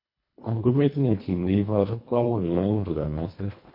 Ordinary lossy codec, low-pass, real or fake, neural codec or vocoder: MP3, 32 kbps; 5.4 kHz; fake; codec, 24 kHz, 1.5 kbps, HILCodec